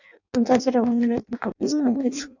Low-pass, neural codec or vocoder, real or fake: 7.2 kHz; codec, 16 kHz in and 24 kHz out, 0.6 kbps, FireRedTTS-2 codec; fake